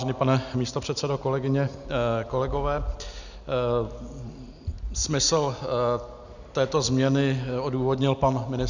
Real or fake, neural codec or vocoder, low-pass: real; none; 7.2 kHz